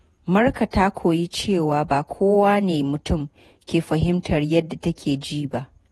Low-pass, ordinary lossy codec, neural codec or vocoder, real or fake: 19.8 kHz; AAC, 32 kbps; vocoder, 48 kHz, 128 mel bands, Vocos; fake